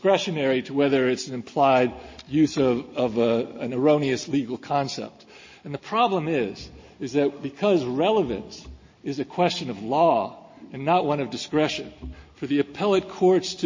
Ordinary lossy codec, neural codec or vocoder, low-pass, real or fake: MP3, 32 kbps; none; 7.2 kHz; real